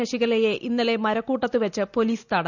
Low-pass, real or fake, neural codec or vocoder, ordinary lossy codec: 7.2 kHz; real; none; none